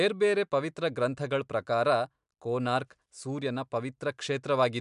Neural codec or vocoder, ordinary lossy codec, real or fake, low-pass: none; MP3, 96 kbps; real; 10.8 kHz